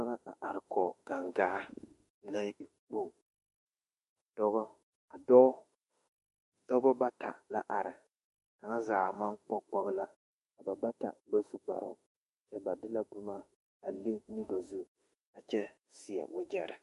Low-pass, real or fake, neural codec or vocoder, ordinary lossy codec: 14.4 kHz; fake; autoencoder, 48 kHz, 32 numbers a frame, DAC-VAE, trained on Japanese speech; MP3, 48 kbps